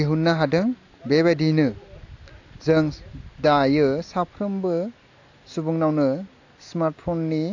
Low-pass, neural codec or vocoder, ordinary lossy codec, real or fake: 7.2 kHz; none; AAC, 48 kbps; real